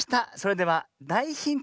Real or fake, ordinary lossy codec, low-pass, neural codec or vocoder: real; none; none; none